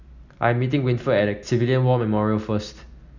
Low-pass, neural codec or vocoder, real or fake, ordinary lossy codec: 7.2 kHz; none; real; none